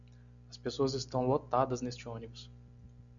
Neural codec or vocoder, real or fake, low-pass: none; real; 7.2 kHz